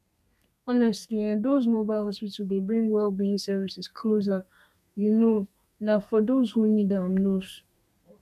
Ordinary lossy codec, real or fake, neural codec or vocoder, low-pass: none; fake; codec, 32 kHz, 1.9 kbps, SNAC; 14.4 kHz